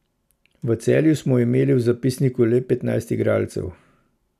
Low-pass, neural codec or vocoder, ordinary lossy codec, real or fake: 14.4 kHz; none; none; real